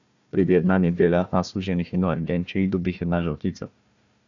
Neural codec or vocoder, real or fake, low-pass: codec, 16 kHz, 1 kbps, FunCodec, trained on Chinese and English, 50 frames a second; fake; 7.2 kHz